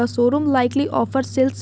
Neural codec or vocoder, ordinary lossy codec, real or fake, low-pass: none; none; real; none